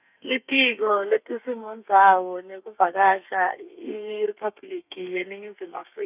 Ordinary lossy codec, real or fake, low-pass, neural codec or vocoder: none; fake; 3.6 kHz; codec, 32 kHz, 1.9 kbps, SNAC